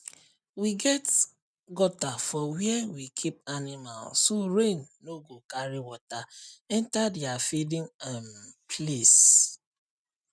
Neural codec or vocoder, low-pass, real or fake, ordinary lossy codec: none; none; real; none